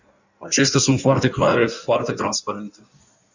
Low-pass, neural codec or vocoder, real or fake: 7.2 kHz; codec, 16 kHz in and 24 kHz out, 1.1 kbps, FireRedTTS-2 codec; fake